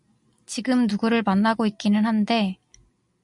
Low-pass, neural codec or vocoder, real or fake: 10.8 kHz; none; real